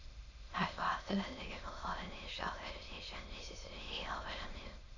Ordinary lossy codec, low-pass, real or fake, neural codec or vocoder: none; 7.2 kHz; fake; autoencoder, 22.05 kHz, a latent of 192 numbers a frame, VITS, trained on many speakers